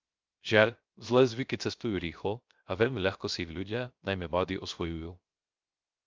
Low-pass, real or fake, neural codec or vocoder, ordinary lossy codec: 7.2 kHz; fake; codec, 16 kHz, 0.3 kbps, FocalCodec; Opus, 24 kbps